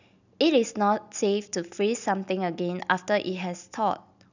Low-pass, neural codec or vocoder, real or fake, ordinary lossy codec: 7.2 kHz; none; real; none